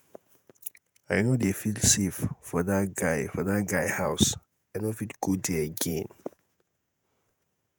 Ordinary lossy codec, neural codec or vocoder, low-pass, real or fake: none; vocoder, 48 kHz, 128 mel bands, Vocos; none; fake